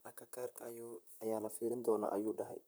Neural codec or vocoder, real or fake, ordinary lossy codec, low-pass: vocoder, 44.1 kHz, 128 mel bands, Pupu-Vocoder; fake; none; none